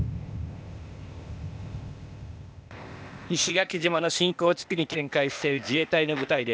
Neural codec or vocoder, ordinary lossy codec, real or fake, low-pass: codec, 16 kHz, 0.8 kbps, ZipCodec; none; fake; none